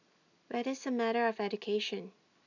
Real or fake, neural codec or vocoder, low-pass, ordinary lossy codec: real; none; 7.2 kHz; none